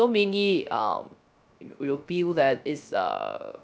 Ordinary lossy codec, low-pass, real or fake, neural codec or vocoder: none; none; fake; codec, 16 kHz, 0.3 kbps, FocalCodec